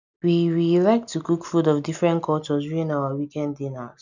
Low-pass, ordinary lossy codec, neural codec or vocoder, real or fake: 7.2 kHz; none; none; real